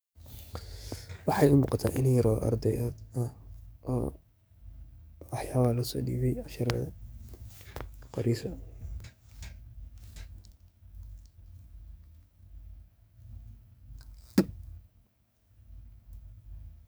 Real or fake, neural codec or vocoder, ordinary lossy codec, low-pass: fake; codec, 44.1 kHz, 7.8 kbps, DAC; none; none